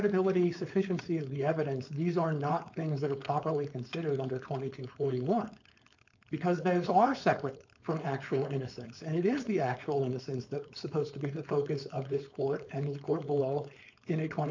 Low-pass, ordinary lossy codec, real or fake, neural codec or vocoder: 7.2 kHz; MP3, 64 kbps; fake; codec, 16 kHz, 4.8 kbps, FACodec